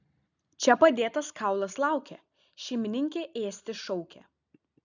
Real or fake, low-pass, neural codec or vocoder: real; 7.2 kHz; none